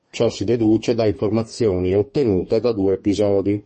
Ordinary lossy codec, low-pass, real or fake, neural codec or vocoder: MP3, 32 kbps; 10.8 kHz; fake; codec, 32 kHz, 1.9 kbps, SNAC